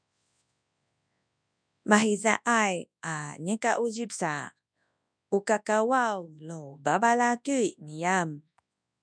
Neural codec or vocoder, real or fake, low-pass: codec, 24 kHz, 0.9 kbps, WavTokenizer, large speech release; fake; 9.9 kHz